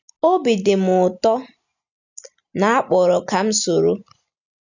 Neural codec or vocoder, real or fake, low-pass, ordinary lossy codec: none; real; 7.2 kHz; none